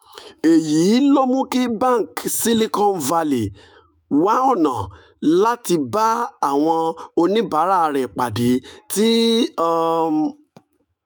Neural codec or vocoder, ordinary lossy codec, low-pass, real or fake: autoencoder, 48 kHz, 128 numbers a frame, DAC-VAE, trained on Japanese speech; none; none; fake